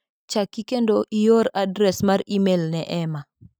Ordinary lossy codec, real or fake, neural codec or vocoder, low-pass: none; real; none; none